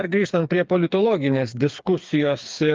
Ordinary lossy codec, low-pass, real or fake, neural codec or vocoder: Opus, 24 kbps; 7.2 kHz; fake; codec, 16 kHz, 4 kbps, FreqCodec, smaller model